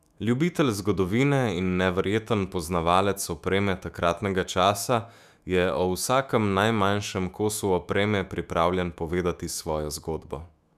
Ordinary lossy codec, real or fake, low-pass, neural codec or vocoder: none; fake; 14.4 kHz; autoencoder, 48 kHz, 128 numbers a frame, DAC-VAE, trained on Japanese speech